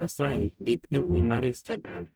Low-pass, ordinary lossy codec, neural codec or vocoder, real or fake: none; none; codec, 44.1 kHz, 0.9 kbps, DAC; fake